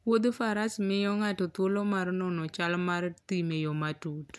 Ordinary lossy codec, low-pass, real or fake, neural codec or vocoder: none; none; real; none